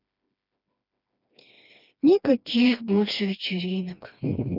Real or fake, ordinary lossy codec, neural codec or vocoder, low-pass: fake; none; codec, 16 kHz, 2 kbps, FreqCodec, smaller model; 5.4 kHz